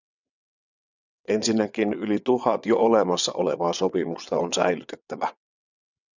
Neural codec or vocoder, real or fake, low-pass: vocoder, 22.05 kHz, 80 mel bands, WaveNeXt; fake; 7.2 kHz